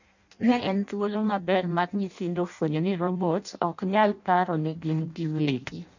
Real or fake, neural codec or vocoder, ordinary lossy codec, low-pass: fake; codec, 16 kHz in and 24 kHz out, 0.6 kbps, FireRedTTS-2 codec; none; 7.2 kHz